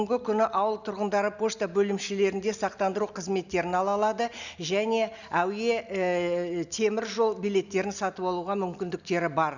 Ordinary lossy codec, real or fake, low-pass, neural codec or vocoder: none; real; 7.2 kHz; none